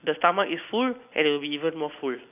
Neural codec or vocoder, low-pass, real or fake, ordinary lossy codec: none; 3.6 kHz; real; none